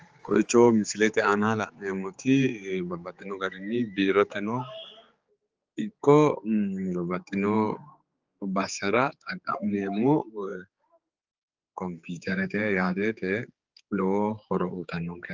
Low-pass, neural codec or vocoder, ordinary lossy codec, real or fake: 7.2 kHz; codec, 16 kHz, 4 kbps, X-Codec, HuBERT features, trained on general audio; Opus, 24 kbps; fake